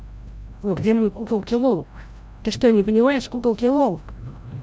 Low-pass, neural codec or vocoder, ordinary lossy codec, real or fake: none; codec, 16 kHz, 0.5 kbps, FreqCodec, larger model; none; fake